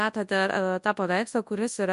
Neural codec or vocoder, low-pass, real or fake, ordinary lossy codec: codec, 24 kHz, 0.9 kbps, WavTokenizer, large speech release; 10.8 kHz; fake; MP3, 64 kbps